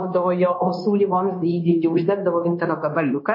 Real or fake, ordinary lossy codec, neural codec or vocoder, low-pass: fake; MP3, 24 kbps; codec, 16 kHz, 0.9 kbps, LongCat-Audio-Codec; 5.4 kHz